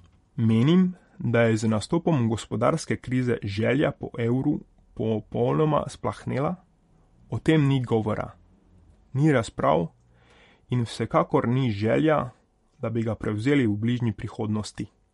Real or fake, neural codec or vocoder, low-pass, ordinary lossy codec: fake; vocoder, 44.1 kHz, 128 mel bands every 512 samples, BigVGAN v2; 19.8 kHz; MP3, 48 kbps